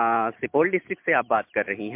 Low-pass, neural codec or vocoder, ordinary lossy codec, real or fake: 3.6 kHz; none; MP3, 32 kbps; real